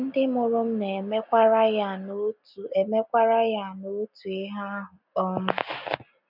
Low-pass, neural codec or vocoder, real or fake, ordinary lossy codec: 5.4 kHz; none; real; none